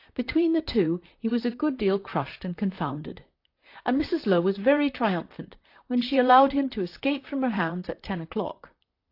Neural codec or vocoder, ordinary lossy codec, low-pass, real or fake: vocoder, 44.1 kHz, 128 mel bands, Pupu-Vocoder; AAC, 32 kbps; 5.4 kHz; fake